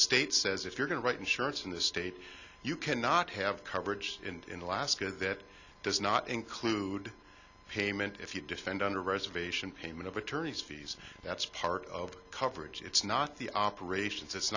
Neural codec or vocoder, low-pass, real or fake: none; 7.2 kHz; real